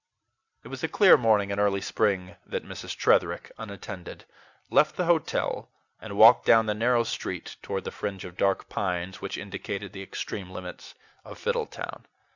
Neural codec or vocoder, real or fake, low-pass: none; real; 7.2 kHz